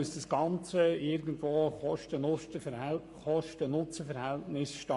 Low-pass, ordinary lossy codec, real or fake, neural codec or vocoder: 14.4 kHz; MP3, 48 kbps; fake; codec, 44.1 kHz, 7.8 kbps, Pupu-Codec